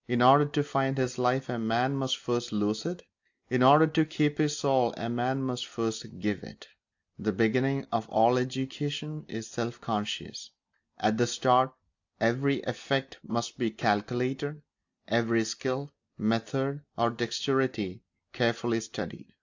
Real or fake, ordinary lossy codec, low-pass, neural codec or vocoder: real; AAC, 48 kbps; 7.2 kHz; none